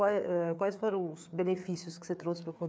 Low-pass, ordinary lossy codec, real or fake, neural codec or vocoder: none; none; fake; codec, 16 kHz, 4 kbps, FreqCodec, larger model